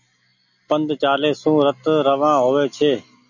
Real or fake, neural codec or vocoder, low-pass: real; none; 7.2 kHz